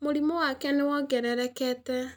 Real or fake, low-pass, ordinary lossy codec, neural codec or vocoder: real; none; none; none